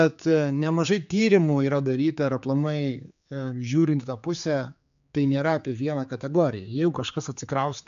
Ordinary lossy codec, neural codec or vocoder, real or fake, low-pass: AAC, 96 kbps; codec, 16 kHz, 4 kbps, X-Codec, HuBERT features, trained on general audio; fake; 7.2 kHz